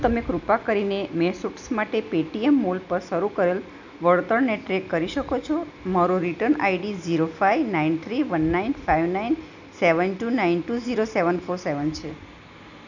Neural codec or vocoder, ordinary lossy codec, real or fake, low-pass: none; none; real; 7.2 kHz